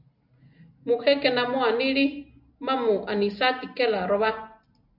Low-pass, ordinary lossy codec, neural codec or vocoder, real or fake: 5.4 kHz; AAC, 48 kbps; none; real